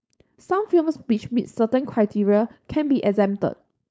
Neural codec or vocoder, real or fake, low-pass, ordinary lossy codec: codec, 16 kHz, 4.8 kbps, FACodec; fake; none; none